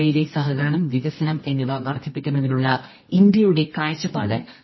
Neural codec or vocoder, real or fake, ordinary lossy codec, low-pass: codec, 24 kHz, 0.9 kbps, WavTokenizer, medium music audio release; fake; MP3, 24 kbps; 7.2 kHz